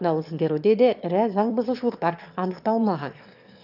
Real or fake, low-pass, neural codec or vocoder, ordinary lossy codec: fake; 5.4 kHz; autoencoder, 22.05 kHz, a latent of 192 numbers a frame, VITS, trained on one speaker; none